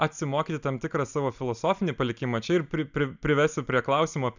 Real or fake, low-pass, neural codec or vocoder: real; 7.2 kHz; none